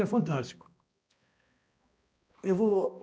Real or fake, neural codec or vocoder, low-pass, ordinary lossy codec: fake; codec, 16 kHz, 1 kbps, X-Codec, HuBERT features, trained on balanced general audio; none; none